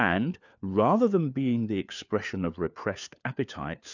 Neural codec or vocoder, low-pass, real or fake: codec, 16 kHz, 4 kbps, FunCodec, trained on LibriTTS, 50 frames a second; 7.2 kHz; fake